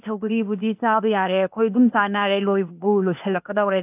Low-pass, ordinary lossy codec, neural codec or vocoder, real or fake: 3.6 kHz; AAC, 32 kbps; codec, 16 kHz, 0.8 kbps, ZipCodec; fake